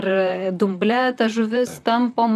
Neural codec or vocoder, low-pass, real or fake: vocoder, 44.1 kHz, 128 mel bands, Pupu-Vocoder; 14.4 kHz; fake